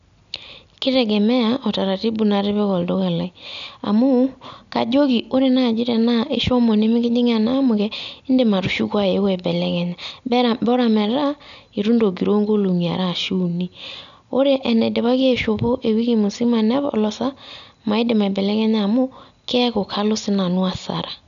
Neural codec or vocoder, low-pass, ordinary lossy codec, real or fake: none; 7.2 kHz; none; real